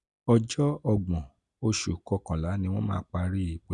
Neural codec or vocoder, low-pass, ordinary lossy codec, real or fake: none; 10.8 kHz; none; real